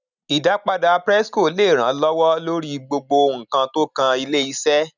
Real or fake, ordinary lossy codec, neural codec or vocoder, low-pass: real; none; none; 7.2 kHz